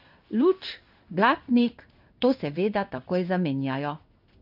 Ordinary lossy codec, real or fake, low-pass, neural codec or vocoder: none; fake; 5.4 kHz; codec, 16 kHz in and 24 kHz out, 1 kbps, XY-Tokenizer